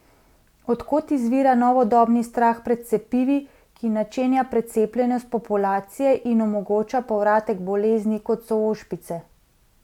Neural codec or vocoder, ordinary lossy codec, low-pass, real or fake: none; none; 19.8 kHz; real